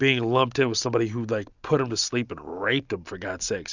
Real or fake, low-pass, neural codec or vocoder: real; 7.2 kHz; none